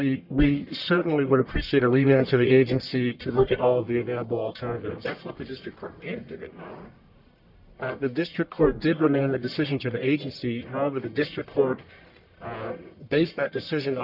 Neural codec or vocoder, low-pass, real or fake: codec, 44.1 kHz, 1.7 kbps, Pupu-Codec; 5.4 kHz; fake